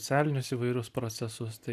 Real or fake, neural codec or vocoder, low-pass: real; none; 14.4 kHz